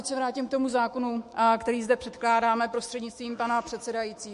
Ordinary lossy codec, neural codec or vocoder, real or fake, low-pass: MP3, 48 kbps; autoencoder, 48 kHz, 128 numbers a frame, DAC-VAE, trained on Japanese speech; fake; 14.4 kHz